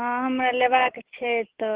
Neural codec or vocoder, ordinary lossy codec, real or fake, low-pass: none; Opus, 16 kbps; real; 3.6 kHz